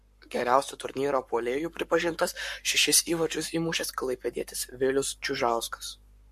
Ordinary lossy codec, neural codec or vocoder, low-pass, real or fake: MP3, 64 kbps; codec, 44.1 kHz, 7.8 kbps, Pupu-Codec; 14.4 kHz; fake